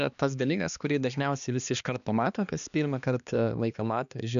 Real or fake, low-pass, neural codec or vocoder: fake; 7.2 kHz; codec, 16 kHz, 2 kbps, X-Codec, HuBERT features, trained on balanced general audio